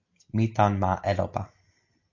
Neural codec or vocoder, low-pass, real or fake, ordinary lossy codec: none; 7.2 kHz; real; AAC, 48 kbps